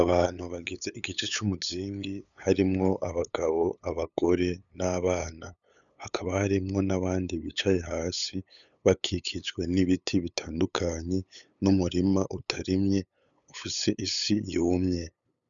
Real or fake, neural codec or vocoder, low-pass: fake; codec, 16 kHz, 8 kbps, FunCodec, trained on LibriTTS, 25 frames a second; 7.2 kHz